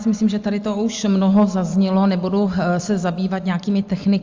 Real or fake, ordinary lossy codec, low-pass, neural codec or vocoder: real; Opus, 32 kbps; 7.2 kHz; none